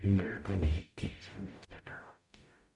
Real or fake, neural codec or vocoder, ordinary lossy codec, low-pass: fake; codec, 44.1 kHz, 0.9 kbps, DAC; none; 10.8 kHz